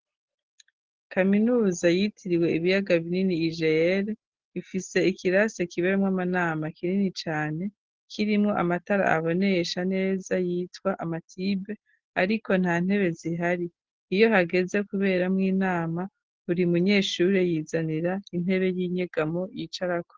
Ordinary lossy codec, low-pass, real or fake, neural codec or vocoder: Opus, 16 kbps; 7.2 kHz; real; none